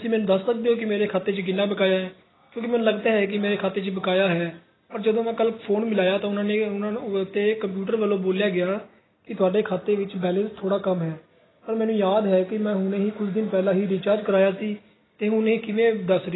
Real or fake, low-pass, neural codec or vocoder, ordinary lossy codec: real; 7.2 kHz; none; AAC, 16 kbps